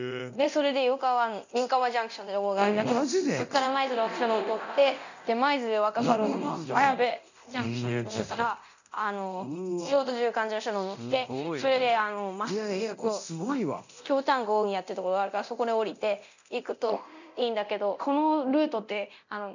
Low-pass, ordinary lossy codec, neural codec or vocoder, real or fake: 7.2 kHz; none; codec, 24 kHz, 0.9 kbps, DualCodec; fake